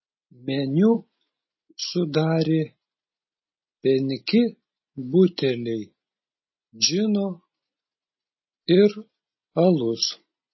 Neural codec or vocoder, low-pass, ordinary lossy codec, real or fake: none; 7.2 kHz; MP3, 24 kbps; real